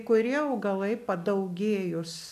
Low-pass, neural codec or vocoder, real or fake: 14.4 kHz; none; real